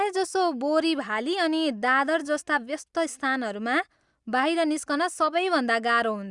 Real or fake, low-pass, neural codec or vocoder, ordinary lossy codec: real; 10.8 kHz; none; Opus, 64 kbps